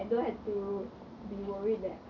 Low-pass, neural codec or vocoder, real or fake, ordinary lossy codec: 7.2 kHz; none; real; none